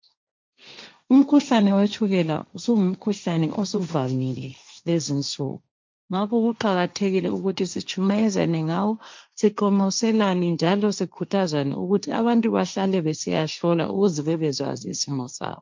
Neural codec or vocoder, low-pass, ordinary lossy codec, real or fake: codec, 16 kHz, 1.1 kbps, Voila-Tokenizer; 7.2 kHz; MP3, 64 kbps; fake